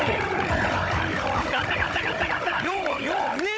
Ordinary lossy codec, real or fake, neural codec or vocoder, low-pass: none; fake; codec, 16 kHz, 16 kbps, FunCodec, trained on Chinese and English, 50 frames a second; none